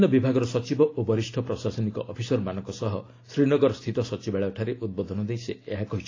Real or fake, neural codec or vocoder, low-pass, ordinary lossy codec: real; none; 7.2 kHz; AAC, 32 kbps